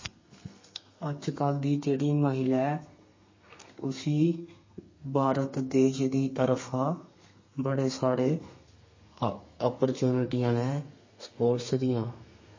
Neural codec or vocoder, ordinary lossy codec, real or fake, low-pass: codec, 32 kHz, 1.9 kbps, SNAC; MP3, 32 kbps; fake; 7.2 kHz